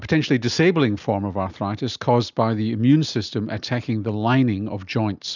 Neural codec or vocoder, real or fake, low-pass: none; real; 7.2 kHz